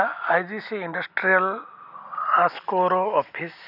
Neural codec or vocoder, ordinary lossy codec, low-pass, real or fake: none; none; 5.4 kHz; real